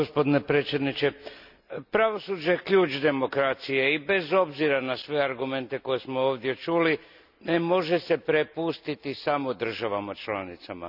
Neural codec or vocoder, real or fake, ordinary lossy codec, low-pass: none; real; none; 5.4 kHz